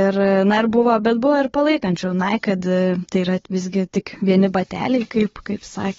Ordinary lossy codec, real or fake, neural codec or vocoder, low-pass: AAC, 24 kbps; fake; codec, 16 kHz, 4 kbps, FunCodec, trained on Chinese and English, 50 frames a second; 7.2 kHz